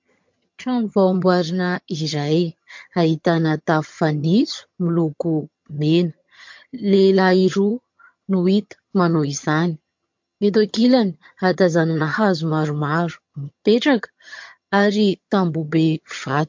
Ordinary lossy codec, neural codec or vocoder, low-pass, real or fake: MP3, 48 kbps; vocoder, 22.05 kHz, 80 mel bands, HiFi-GAN; 7.2 kHz; fake